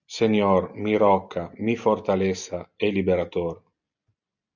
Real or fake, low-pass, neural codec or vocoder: real; 7.2 kHz; none